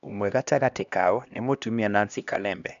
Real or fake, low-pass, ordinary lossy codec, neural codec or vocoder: fake; 7.2 kHz; none; codec, 16 kHz, 1 kbps, X-Codec, HuBERT features, trained on LibriSpeech